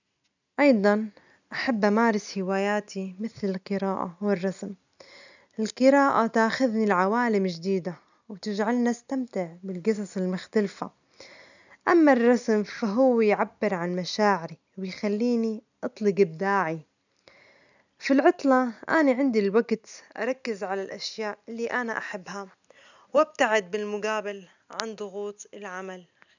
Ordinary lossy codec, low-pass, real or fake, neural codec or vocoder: none; 7.2 kHz; real; none